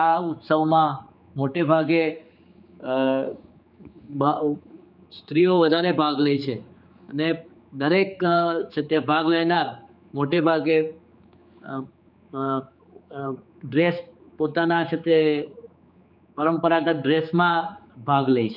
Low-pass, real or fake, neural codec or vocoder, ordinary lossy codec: 5.4 kHz; fake; codec, 16 kHz, 4 kbps, X-Codec, HuBERT features, trained on general audio; none